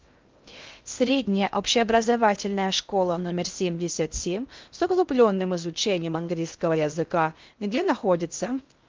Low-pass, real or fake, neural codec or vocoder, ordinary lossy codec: 7.2 kHz; fake; codec, 16 kHz in and 24 kHz out, 0.6 kbps, FocalCodec, streaming, 2048 codes; Opus, 32 kbps